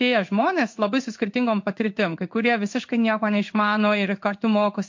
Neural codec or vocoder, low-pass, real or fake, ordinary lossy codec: codec, 16 kHz in and 24 kHz out, 1 kbps, XY-Tokenizer; 7.2 kHz; fake; MP3, 48 kbps